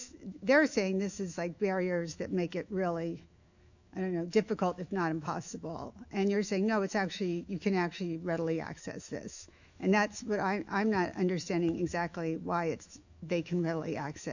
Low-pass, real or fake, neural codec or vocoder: 7.2 kHz; fake; autoencoder, 48 kHz, 128 numbers a frame, DAC-VAE, trained on Japanese speech